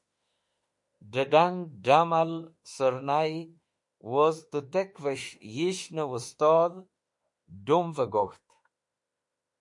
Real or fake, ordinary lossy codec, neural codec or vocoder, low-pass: fake; MP3, 48 kbps; autoencoder, 48 kHz, 32 numbers a frame, DAC-VAE, trained on Japanese speech; 10.8 kHz